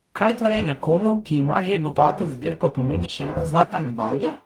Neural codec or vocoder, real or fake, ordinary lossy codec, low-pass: codec, 44.1 kHz, 0.9 kbps, DAC; fake; Opus, 32 kbps; 19.8 kHz